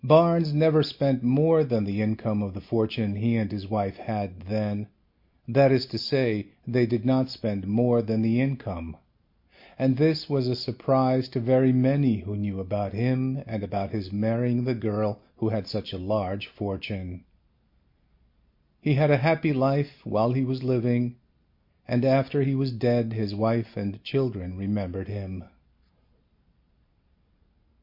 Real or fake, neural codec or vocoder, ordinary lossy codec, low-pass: real; none; MP3, 32 kbps; 5.4 kHz